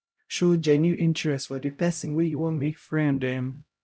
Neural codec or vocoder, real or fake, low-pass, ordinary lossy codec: codec, 16 kHz, 0.5 kbps, X-Codec, HuBERT features, trained on LibriSpeech; fake; none; none